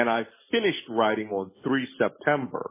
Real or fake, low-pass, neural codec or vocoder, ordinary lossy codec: real; 3.6 kHz; none; MP3, 16 kbps